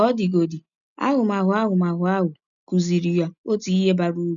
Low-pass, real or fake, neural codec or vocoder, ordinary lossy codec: 7.2 kHz; real; none; none